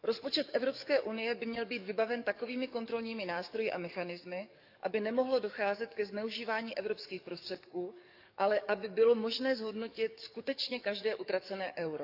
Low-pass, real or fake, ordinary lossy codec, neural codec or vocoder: 5.4 kHz; fake; none; codec, 44.1 kHz, 7.8 kbps, DAC